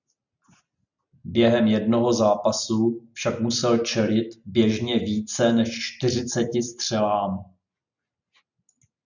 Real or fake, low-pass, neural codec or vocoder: real; 7.2 kHz; none